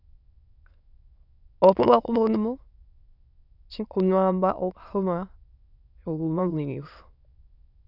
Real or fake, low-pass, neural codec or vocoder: fake; 5.4 kHz; autoencoder, 22.05 kHz, a latent of 192 numbers a frame, VITS, trained on many speakers